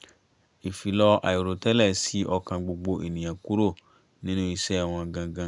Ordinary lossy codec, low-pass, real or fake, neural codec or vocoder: none; 10.8 kHz; real; none